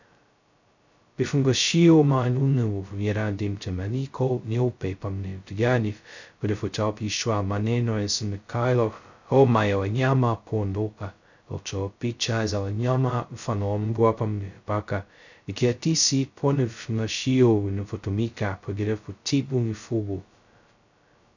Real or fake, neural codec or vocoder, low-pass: fake; codec, 16 kHz, 0.2 kbps, FocalCodec; 7.2 kHz